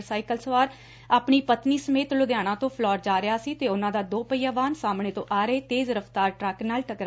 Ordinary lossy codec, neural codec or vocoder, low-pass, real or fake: none; none; none; real